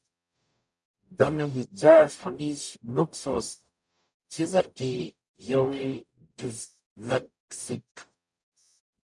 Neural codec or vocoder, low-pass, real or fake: codec, 44.1 kHz, 0.9 kbps, DAC; 10.8 kHz; fake